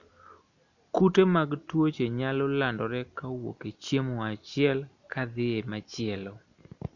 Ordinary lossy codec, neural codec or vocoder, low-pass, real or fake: none; none; 7.2 kHz; real